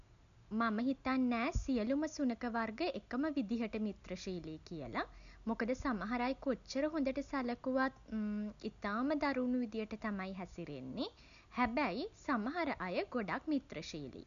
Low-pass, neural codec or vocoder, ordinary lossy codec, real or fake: 7.2 kHz; none; none; real